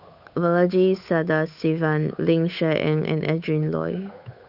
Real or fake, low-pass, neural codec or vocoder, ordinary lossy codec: fake; 5.4 kHz; codec, 16 kHz, 8 kbps, FunCodec, trained on Chinese and English, 25 frames a second; none